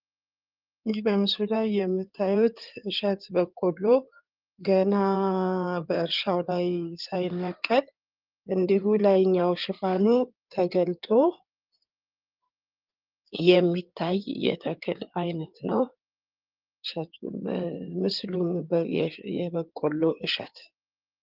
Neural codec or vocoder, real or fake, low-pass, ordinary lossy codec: codec, 16 kHz in and 24 kHz out, 2.2 kbps, FireRedTTS-2 codec; fake; 5.4 kHz; Opus, 32 kbps